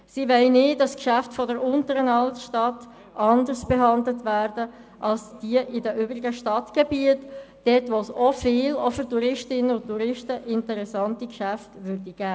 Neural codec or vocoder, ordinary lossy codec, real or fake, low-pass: none; none; real; none